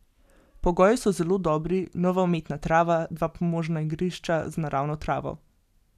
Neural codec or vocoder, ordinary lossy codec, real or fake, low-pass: none; none; real; 14.4 kHz